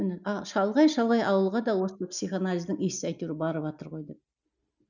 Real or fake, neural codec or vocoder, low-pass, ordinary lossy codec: real; none; 7.2 kHz; none